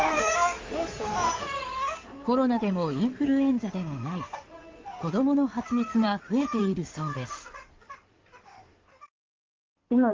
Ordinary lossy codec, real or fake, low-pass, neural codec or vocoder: Opus, 32 kbps; fake; 7.2 kHz; codec, 16 kHz in and 24 kHz out, 2.2 kbps, FireRedTTS-2 codec